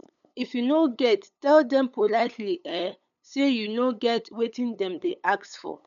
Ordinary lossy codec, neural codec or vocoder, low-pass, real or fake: none; codec, 16 kHz, 8 kbps, FunCodec, trained on LibriTTS, 25 frames a second; 7.2 kHz; fake